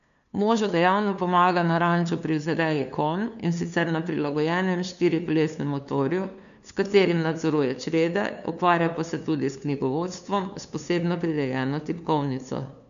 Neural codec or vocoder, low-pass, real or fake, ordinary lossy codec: codec, 16 kHz, 2 kbps, FunCodec, trained on LibriTTS, 25 frames a second; 7.2 kHz; fake; none